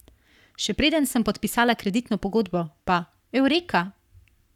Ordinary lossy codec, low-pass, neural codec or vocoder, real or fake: none; 19.8 kHz; codec, 44.1 kHz, 7.8 kbps, Pupu-Codec; fake